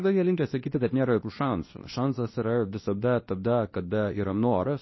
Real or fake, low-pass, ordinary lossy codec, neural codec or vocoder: fake; 7.2 kHz; MP3, 24 kbps; codec, 24 kHz, 0.9 kbps, WavTokenizer, medium speech release version 2